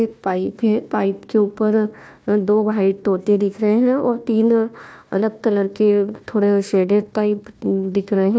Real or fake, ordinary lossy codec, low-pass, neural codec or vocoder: fake; none; none; codec, 16 kHz, 1 kbps, FunCodec, trained on Chinese and English, 50 frames a second